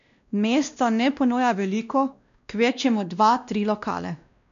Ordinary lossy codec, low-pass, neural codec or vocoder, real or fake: none; 7.2 kHz; codec, 16 kHz, 1 kbps, X-Codec, WavLM features, trained on Multilingual LibriSpeech; fake